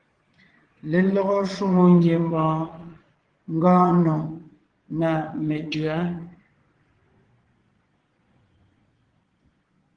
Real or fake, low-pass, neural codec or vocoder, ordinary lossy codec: fake; 9.9 kHz; vocoder, 22.05 kHz, 80 mel bands, Vocos; Opus, 16 kbps